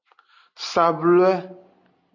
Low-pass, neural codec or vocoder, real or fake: 7.2 kHz; none; real